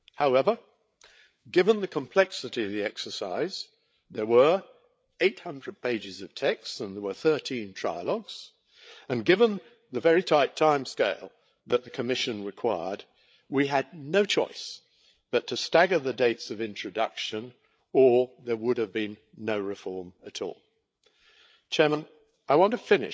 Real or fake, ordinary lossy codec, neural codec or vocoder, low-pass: fake; none; codec, 16 kHz, 8 kbps, FreqCodec, larger model; none